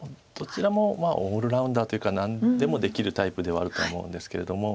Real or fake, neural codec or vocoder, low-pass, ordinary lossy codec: real; none; none; none